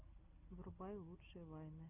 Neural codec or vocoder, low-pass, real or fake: none; 3.6 kHz; real